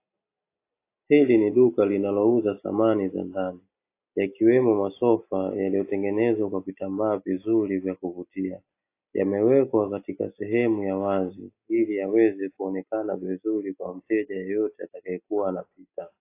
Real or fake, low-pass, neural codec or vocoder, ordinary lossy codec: real; 3.6 kHz; none; AAC, 24 kbps